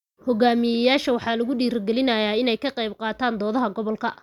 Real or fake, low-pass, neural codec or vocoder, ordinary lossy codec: real; 19.8 kHz; none; none